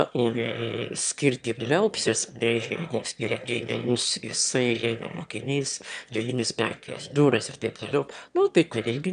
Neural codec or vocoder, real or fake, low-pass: autoencoder, 22.05 kHz, a latent of 192 numbers a frame, VITS, trained on one speaker; fake; 9.9 kHz